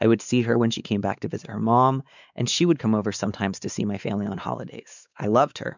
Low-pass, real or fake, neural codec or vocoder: 7.2 kHz; fake; codec, 16 kHz, 4 kbps, X-Codec, WavLM features, trained on Multilingual LibriSpeech